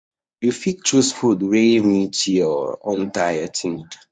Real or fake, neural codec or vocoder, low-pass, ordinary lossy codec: fake; codec, 24 kHz, 0.9 kbps, WavTokenizer, medium speech release version 1; 9.9 kHz; none